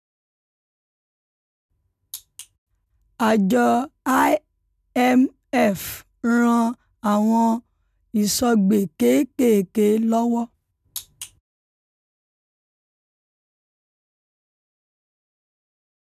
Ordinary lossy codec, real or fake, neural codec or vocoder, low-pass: none; real; none; 14.4 kHz